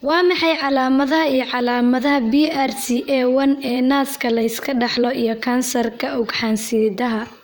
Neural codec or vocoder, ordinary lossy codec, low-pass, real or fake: vocoder, 44.1 kHz, 128 mel bands, Pupu-Vocoder; none; none; fake